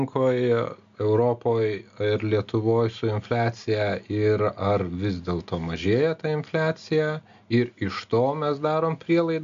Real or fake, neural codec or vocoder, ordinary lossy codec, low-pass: real; none; MP3, 64 kbps; 7.2 kHz